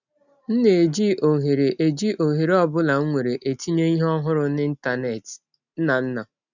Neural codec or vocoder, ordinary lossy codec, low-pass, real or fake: none; none; 7.2 kHz; real